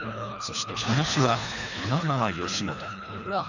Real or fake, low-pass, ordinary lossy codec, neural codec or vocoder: fake; 7.2 kHz; none; codec, 24 kHz, 3 kbps, HILCodec